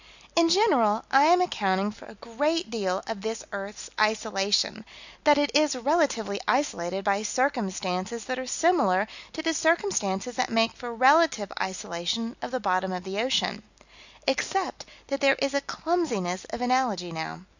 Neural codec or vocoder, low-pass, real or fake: none; 7.2 kHz; real